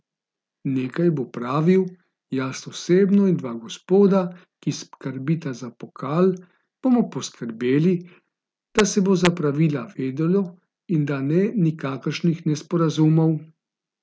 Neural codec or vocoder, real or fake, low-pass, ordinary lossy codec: none; real; none; none